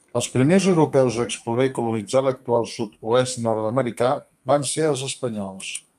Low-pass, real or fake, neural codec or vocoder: 14.4 kHz; fake; codec, 32 kHz, 1.9 kbps, SNAC